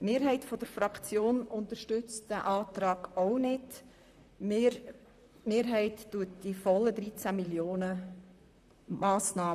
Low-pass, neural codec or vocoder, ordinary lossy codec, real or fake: 14.4 kHz; vocoder, 44.1 kHz, 128 mel bands, Pupu-Vocoder; none; fake